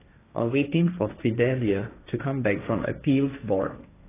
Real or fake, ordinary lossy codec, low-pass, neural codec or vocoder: fake; AAC, 16 kbps; 3.6 kHz; codec, 16 kHz, 1.1 kbps, Voila-Tokenizer